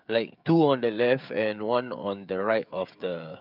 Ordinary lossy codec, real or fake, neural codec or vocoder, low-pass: none; fake; codec, 16 kHz, 16 kbps, FreqCodec, smaller model; 5.4 kHz